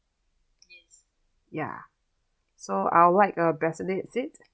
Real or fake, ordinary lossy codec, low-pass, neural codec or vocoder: real; none; none; none